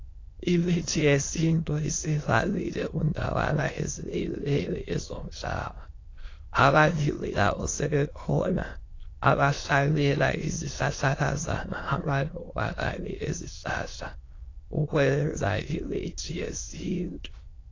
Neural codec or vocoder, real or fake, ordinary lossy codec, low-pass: autoencoder, 22.05 kHz, a latent of 192 numbers a frame, VITS, trained on many speakers; fake; AAC, 32 kbps; 7.2 kHz